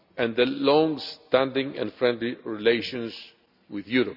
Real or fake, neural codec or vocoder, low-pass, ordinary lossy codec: real; none; 5.4 kHz; none